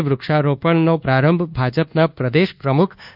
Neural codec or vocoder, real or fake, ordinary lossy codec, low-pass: codec, 24 kHz, 1.2 kbps, DualCodec; fake; none; 5.4 kHz